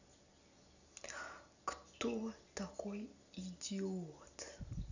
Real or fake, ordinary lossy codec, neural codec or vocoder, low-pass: real; none; none; 7.2 kHz